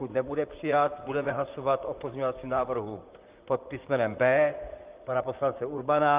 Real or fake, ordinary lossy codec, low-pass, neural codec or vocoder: fake; Opus, 24 kbps; 3.6 kHz; vocoder, 44.1 kHz, 128 mel bands, Pupu-Vocoder